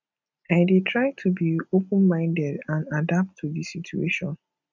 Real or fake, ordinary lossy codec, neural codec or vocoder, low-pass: real; none; none; 7.2 kHz